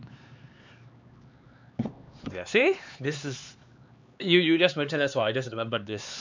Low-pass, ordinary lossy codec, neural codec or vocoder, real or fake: 7.2 kHz; MP3, 64 kbps; codec, 16 kHz, 4 kbps, X-Codec, HuBERT features, trained on LibriSpeech; fake